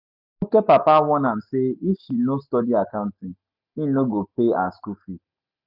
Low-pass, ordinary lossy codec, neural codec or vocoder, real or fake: 5.4 kHz; none; none; real